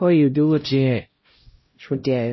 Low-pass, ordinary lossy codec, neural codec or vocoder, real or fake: 7.2 kHz; MP3, 24 kbps; codec, 16 kHz, 0.5 kbps, X-Codec, HuBERT features, trained on LibriSpeech; fake